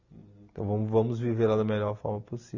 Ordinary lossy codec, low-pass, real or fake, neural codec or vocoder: none; 7.2 kHz; real; none